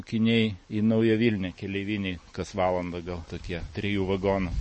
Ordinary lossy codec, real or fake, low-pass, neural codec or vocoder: MP3, 32 kbps; real; 10.8 kHz; none